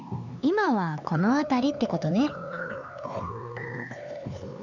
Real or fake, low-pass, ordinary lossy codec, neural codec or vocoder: fake; 7.2 kHz; none; codec, 16 kHz, 4 kbps, X-Codec, HuBERT features, trained on LibriSpeech